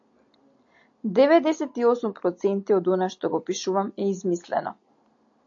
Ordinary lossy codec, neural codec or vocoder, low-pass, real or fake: AAC, 48 kbps; none; 7.2 kHz; real